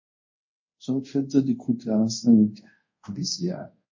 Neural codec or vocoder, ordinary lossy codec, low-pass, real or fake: codec, 24 kHz, 0.5 kbps, DualCodec; MP3, 32 kbps; 7.2 kHz; fake